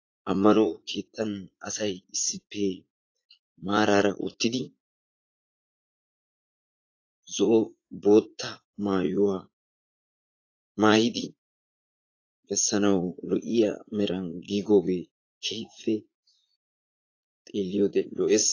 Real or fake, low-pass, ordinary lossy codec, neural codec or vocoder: fake; 7.2 kHz; AAC, 32 kbps; vocoder, 44.1 kHz, 80 mel bands, Vocos